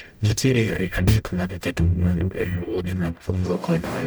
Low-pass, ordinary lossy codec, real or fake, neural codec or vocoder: none; none; fake; codec, 44.1 kHz, 0.9 kbps, DAC